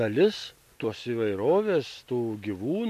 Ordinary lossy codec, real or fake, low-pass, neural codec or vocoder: MP3, 64 kbps; real; 14.4 kHz; none